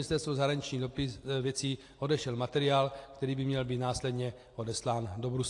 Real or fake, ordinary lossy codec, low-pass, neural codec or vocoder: real; AAC, 48 kbps; 10.8 kHz; none